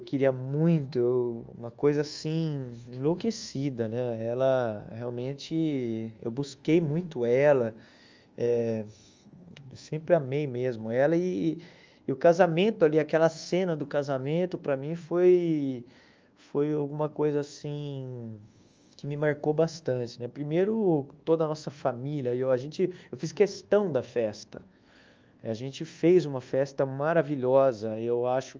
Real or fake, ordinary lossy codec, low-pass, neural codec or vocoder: fake; Opus, 32 kbps; 7.2 kHz; codec, 24 kHz, 1.2 kbps, DualCodec